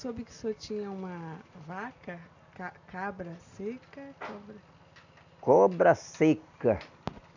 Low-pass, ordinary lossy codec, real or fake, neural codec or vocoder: 7.2 kHz; none; real; none